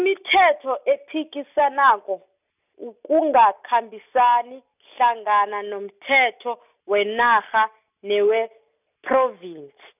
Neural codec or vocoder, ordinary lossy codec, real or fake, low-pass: none; none; real; 3.6 kHz